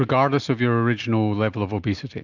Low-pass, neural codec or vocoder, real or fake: 7.2 kHz; none; real